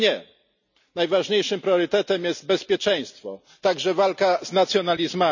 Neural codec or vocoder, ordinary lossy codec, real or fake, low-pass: none; none; real; 7.2 kHz